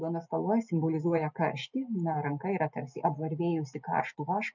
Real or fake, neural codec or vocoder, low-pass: real; none; 7.2 kHz